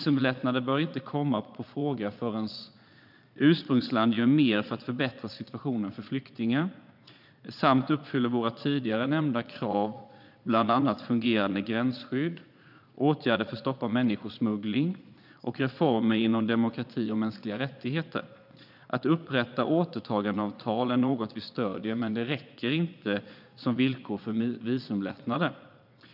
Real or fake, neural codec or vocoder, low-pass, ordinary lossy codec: fake; vocoder, 22.05 kHz, 80 mel bands, Vocos; 5.4 kHz; none